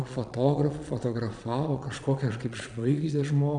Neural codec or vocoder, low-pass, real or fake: vocoder, 22.05 kHz, 80 mel bands, Vocos; 9.9 kHz; fake